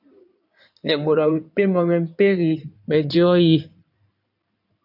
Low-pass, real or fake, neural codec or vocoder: 5.4 kHz; fake; codec, 16 kHz in and 24 kHz out, 2.2 kbps, FireRedTTS-2 codec